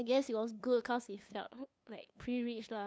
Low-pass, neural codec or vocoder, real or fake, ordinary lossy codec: none; codec, 16 kHz, 2 kbps, FunCodec, trained on LibriTTS, 25 frames a second; fake; none